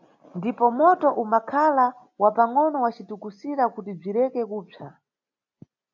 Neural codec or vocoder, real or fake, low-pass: none; real; 7.2 kHz